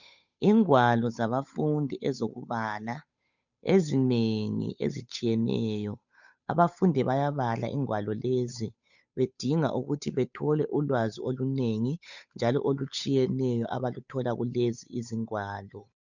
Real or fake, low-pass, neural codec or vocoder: fake; 7.2 kHz; codec, 16 kHz, 8 kbps, FunCodec, trained on Chinese and English, 25 frames a second